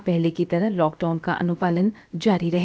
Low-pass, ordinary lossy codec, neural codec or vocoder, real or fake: none; none; codec, 16 kHz, about 1 kbps, DyCAST, with the encoder's durations; fake